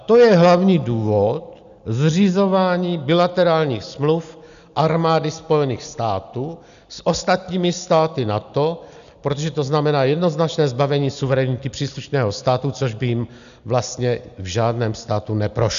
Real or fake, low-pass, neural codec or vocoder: real; 7.2 kHz; none